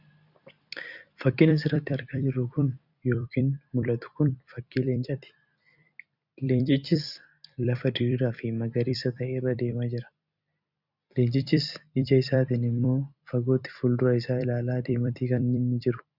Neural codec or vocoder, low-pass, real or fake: vocoder, 44.1 kHz, 128 mel bands every 256 samples, BigVGAN v2; 5.4 kHz; fake